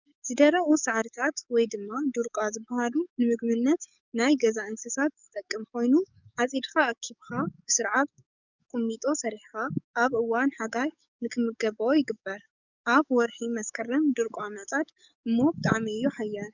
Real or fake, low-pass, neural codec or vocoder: fake; 7.2 kHz; codec, 44.1 kHz, 7.8 kbps, DAC